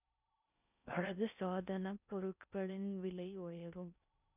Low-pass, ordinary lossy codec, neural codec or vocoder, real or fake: 3.6 kHz; none; codec, 16 kHz in and 24 kHz out, 0.6 kbps, FocalCodec, streaming, 4096 codes; fake